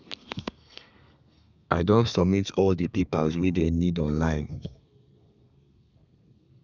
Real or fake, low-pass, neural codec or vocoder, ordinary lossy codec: fake; 7.2 kHz; codec, 32 kHz, 1.9 kbps, SNAC; none